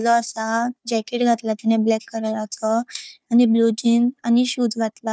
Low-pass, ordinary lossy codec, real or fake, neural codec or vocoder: none; none; fake; codec, 16 kHz, 4 kbps, FunCodec, trained on Chinese and English, 50 frames a second